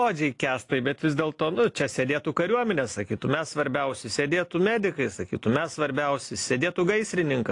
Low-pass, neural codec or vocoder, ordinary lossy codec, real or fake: 10.8 kHz; none; AAC, 48 kbps; real